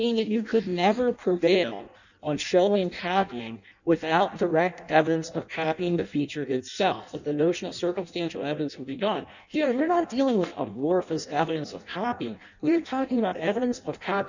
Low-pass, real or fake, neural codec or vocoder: 7.2 kHz; fake; codec, 16 kHz in and 24 kHz out, 0.6 kbps, FireRedTTS-2 codec